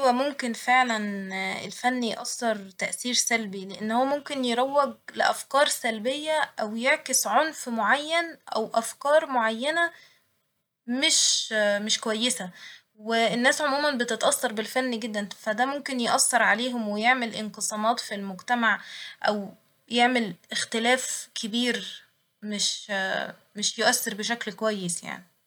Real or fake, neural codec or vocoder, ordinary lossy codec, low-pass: real; none; none; none